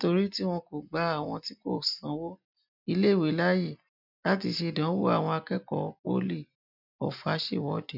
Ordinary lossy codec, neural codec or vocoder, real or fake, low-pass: none; none; real; 5.4 kHz